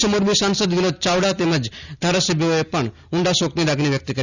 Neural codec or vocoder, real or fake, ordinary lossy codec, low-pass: none; real; none; 7.2 kHz